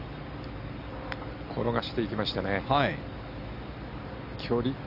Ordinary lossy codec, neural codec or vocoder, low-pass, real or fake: none; none; 5.4 kHz; real